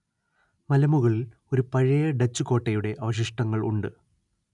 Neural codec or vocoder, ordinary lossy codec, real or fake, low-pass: none; none; real; 10.8 kHz